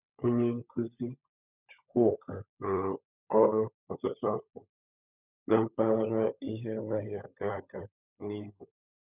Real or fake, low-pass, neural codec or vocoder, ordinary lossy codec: fake; 3.6 kHz; codec, 16 kHz, 16 kbps, FunCodec, trained on LibriTTS, 50 frames a second; none